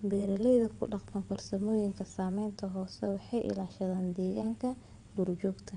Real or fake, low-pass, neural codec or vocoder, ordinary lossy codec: fake; 9.9 kHz; vocoder, 22.05 kHz, 80 mel bands, WaveNeXt; none